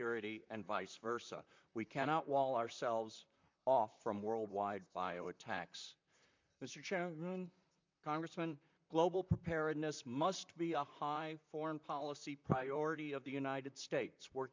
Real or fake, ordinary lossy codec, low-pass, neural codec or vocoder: fake; MP3, 64 kbps; 7.2 kHz; vocoder, 44.1 kHz, 128 mel bands, Pupu-Vocoder